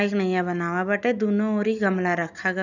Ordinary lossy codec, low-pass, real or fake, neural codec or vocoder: none; 7.2 kHz; real; none